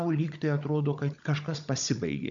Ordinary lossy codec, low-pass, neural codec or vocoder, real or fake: MP3, 64 kbps; 7.2 kHz; codec, 16 kHz, 16 kbps, FunCodec, trained on Chinese and English, 50 frames a second; fake